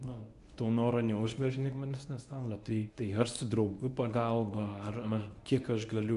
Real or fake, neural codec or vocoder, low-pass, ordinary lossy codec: fake; codec, 24 kHz, 0.9 kbps, WavTokenizer, medium speech release version 1; 10.8 kHz; AAC, 96 kbps